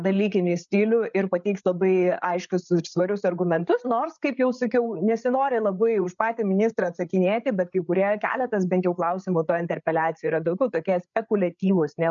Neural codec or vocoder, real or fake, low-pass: codec, 16 kHz, 8 kbps, FreqCodec, larger model; fake; 7.2 kHz